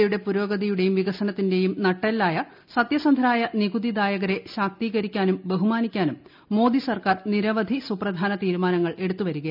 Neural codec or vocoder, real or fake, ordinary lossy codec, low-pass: none; real; none; 5.4 kHz